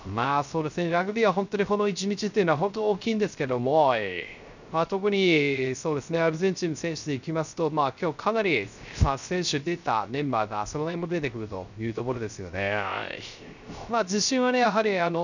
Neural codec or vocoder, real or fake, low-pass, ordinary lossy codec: codec, 16 kHz, 0.3 kbps, FocalCodec; fake; 7.2 kHz; none